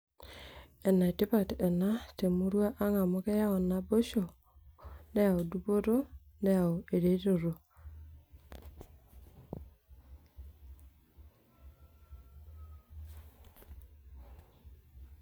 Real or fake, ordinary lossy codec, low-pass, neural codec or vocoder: real; none; none; none